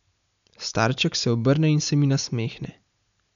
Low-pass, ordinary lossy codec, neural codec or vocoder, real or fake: 7.2 kHz; none; none; real